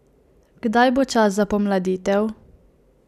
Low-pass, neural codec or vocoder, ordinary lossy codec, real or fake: 14.4 kHz; none; none; real